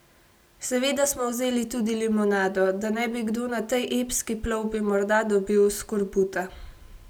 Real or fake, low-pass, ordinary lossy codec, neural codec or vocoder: real; none; none; none